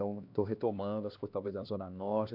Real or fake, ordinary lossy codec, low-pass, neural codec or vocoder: fake; MP3, 48 kbps; 5.4 kHz; codec, 16 kHz, 2 kbps, X-Codec, HuBERT features, trained on LibriSpeech